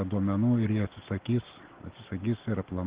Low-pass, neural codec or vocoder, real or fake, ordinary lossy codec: 3.6 kHz; none; real; Opus, 16 kbps